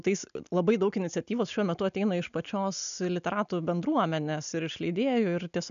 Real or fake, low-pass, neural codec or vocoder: real; 7.2 kHz; none